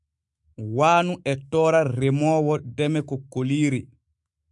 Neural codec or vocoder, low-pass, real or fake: autoencoder, 48 kHz, 128 numbers a frame, DAC-VAE, trained on Japanese speech; 10.8 kHz; fake